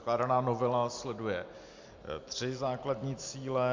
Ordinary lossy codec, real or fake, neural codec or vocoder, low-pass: MP3, 64 kbps; real; none; 7.2 kHz